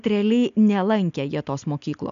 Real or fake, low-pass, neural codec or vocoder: real; 7.2 kHz; none